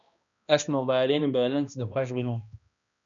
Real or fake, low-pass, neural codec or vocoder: fake; 7.2 kHz; codec, 16 kHz, 1 kbps, X-Codec, HuBERT features, trained on balanced general audio